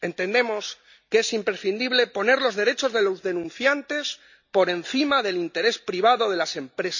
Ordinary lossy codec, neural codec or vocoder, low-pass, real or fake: none; none; 7.2 kHz; real